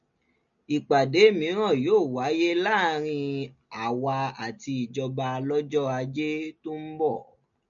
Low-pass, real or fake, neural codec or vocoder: 7.2 kHz; real; none